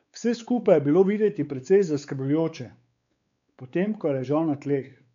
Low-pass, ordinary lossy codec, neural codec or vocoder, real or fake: 7.2 kHz; MP3, 64 kbps; codec, 16 kHz, 4 kbps, X-Codec, WavLM features, trained on Multilingual LibriSpeech; fake